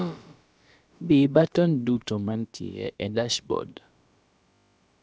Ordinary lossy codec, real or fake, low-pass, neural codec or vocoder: none; fake; none; codec, 16 kHz, about 1 kbps, DyCAST, with the encoder's durations